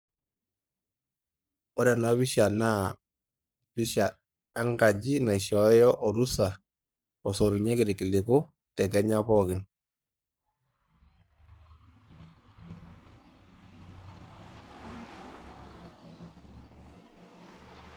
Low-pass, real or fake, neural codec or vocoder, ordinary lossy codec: none; fake; codec, 44.1 kHz, 3.4 kbps, Pupu-Codec; none